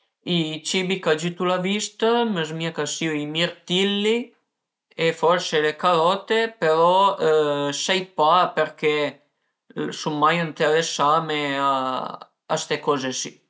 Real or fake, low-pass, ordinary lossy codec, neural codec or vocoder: real; none; none; none